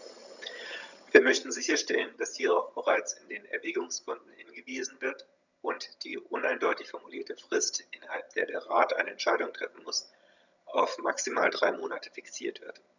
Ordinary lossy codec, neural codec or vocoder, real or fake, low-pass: none; vocoder, 22.05 kHz, 80 mel bands, HiFi-GAN; fake; 7.2 kHz